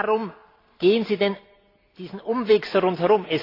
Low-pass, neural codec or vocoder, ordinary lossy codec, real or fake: 5.4 kHz; none; AAC, 24 kbps; real